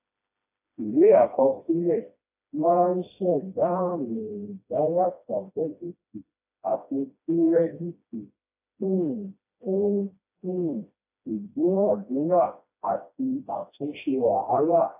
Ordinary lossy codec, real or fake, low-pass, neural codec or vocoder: AAC, 32 kbps; fake; 3.6 kHz; codec, 16 kHz, 1 kbps, FreqCodec, smaller model